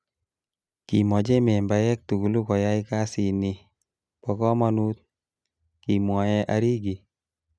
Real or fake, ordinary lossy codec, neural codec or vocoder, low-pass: real; none; none; none